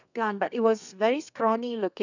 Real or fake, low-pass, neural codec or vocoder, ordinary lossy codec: fake; 7.2 kHz; codec, 32 kHz, 1.9 kbps, SNAC; none